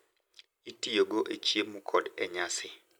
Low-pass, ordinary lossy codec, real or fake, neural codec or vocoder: none; none; real; none